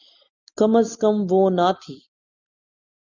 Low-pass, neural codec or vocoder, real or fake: 7.2 kHz; none; real